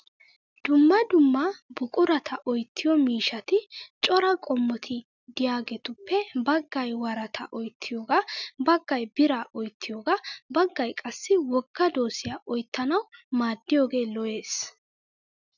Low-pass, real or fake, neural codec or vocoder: 7.2 kHz; real; none